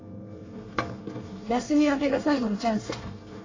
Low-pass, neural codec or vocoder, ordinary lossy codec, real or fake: 7.2 kHz; codec, 24 kHz, 1 kbps, SNAC; AAC, 32 kbps; fake